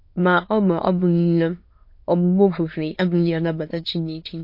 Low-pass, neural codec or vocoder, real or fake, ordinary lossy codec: 5.4 kHz; autoencoder, 22.05 kHz, a latent of 192 numbers a frame, VITS, trained on many speakers; fake; MP3, 32 kbps